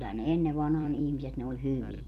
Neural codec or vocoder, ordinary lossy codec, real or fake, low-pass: vocoder, 44.1 kHz, 128 mel bands every 256 samples, BigVGAN v2; none; fake; 14.4 kHz